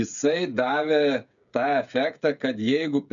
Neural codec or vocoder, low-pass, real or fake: codec, 16 kHz, 8 kbps, FreqCodec, smaller model; 7.2 kHz; fake